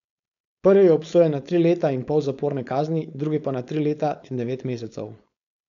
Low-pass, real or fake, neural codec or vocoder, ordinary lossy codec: 7.2 kHz; fake; codec, 16 kHz, 4.8 kbps, FACodec; MP3, 96 kbps